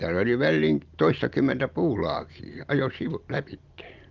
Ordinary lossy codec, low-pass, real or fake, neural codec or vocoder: Opus, 32 kbps; 7.2 kHz; real; none